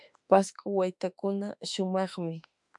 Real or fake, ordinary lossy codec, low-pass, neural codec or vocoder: fake; MP3, 96 kbps; 10.8 kHz; autoencoder, 48 kHz, 32 numbers a frame, DAC-VAE, trained on Japanese speech